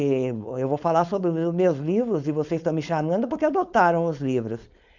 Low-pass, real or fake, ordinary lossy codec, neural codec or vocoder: 7.2 kHz; fake; none; codec, 16 kHz, 4.8 kbps, FACodec